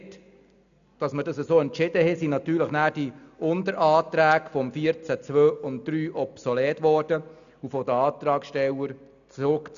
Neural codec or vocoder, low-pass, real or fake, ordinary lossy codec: none; 7.2 kHz; real; none